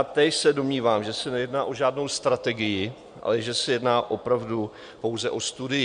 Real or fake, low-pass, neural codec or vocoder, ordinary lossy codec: real; 9.9 kHz; none; MP3, 64 kbps